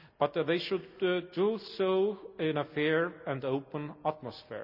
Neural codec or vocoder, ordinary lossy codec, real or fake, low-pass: none; none; real; 5.4 kHz